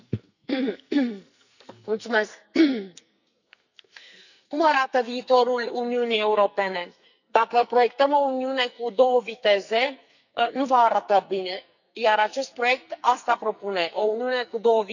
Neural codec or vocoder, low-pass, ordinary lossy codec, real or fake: codec, 44.1 kHz, 2.6 kbps, SNAC; 7.2 kHz; none; fake